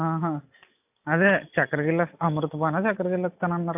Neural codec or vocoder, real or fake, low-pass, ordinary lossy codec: none; real; 3.6 kHz; AAC, 32 kbps